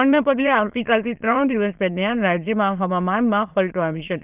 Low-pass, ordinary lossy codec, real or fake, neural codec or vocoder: 3.6 kHz; Opus, 24 kbps; fake; autoencoder, 22.05 kHz, a latent of 192 numbers a frame, VITS, trained on many speakers